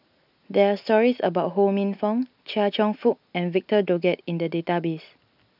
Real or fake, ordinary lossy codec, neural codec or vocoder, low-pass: real; none; none; 5.4 kHz